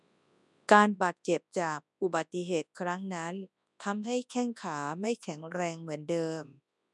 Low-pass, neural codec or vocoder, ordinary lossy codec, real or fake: 10.8 kHz; codec, 24 kHz, 0.9 kbps, WavTokenizer, large speech release; none; fake